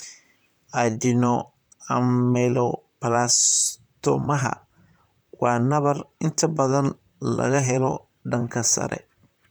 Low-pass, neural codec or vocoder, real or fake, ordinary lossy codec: none; vocoder, 44.1 kHz, 128 mel bands, Pupu-Vocoder; fake; none